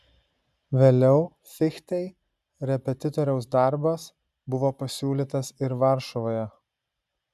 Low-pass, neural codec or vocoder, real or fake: 14.4 kHz; none; real